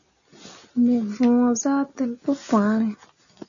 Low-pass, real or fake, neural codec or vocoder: 7.2 kHz; real; none